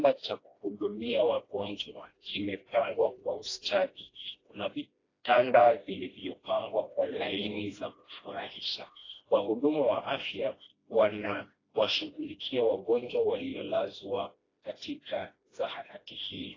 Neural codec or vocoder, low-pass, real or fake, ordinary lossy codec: codec, 16 kHz, 1 kbps, FreqCodec, smaller model; 7.2 kHz; fake; AAC, 32 kbps